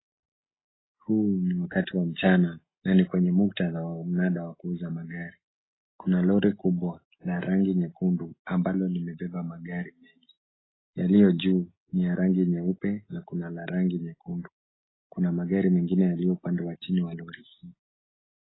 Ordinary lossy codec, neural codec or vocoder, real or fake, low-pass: AAC, 16 kbps; none; real; 7.2 kHz